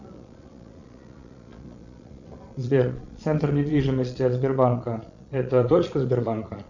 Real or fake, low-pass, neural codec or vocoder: fake; 7.2 kHz; vocoder, 22.05 kHz, 80 mel bands, Vocos